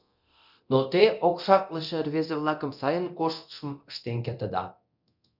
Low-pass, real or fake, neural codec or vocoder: 5.4 kHz; fake; codec, 24 kHz, 0.9 kbps, DualCodec